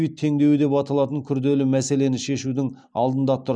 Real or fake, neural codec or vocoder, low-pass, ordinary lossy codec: real; none; none; none